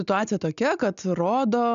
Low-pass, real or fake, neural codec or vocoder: 7.2 kHz; real; none